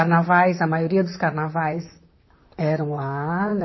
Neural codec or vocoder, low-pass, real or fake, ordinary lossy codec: vocoder, 44.1 kHz, 128 mel bands, Pupu-Vocoder; 7.2 kHz; fake; MP3, 24 kbps